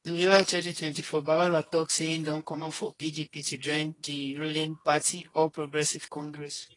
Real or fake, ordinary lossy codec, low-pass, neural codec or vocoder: fake; AAC, 32 kbps; 10.8 kHz; codec, 24 kHz, 0.9 kbps, WavTokenizer, medium music audio release